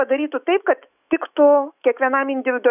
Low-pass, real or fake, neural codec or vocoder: 3.6 kHz; fake; autoencoder, 48 kHz, 128 numbers a frame, DAC-VAE, trained on Japanese speech